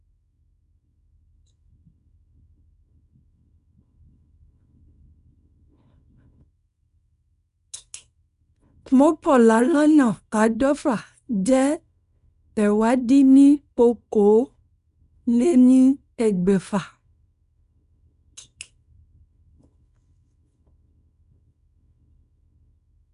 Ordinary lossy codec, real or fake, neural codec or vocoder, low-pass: none; fake; codec, 24 kHz, 0.9 kbps, WavTokenizer, small release; 10.8 kHz